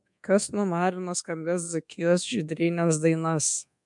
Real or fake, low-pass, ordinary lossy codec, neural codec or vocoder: fake; 10.8 kHz; MP3, 64 kbps; codec, 24 kHz, 1.2 kbps, DualCodec